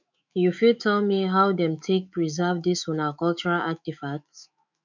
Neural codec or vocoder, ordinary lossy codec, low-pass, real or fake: autoencoder, 48 kHz, 128 numbers a frame, DAC-VAE, trained on Japanese speech; none; 7.2 kHz; fake